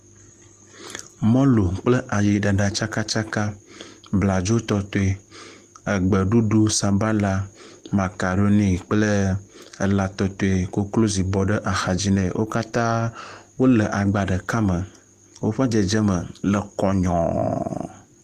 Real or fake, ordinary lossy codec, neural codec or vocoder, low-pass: real; Opus, 24 kbps; none; 14.4 kHz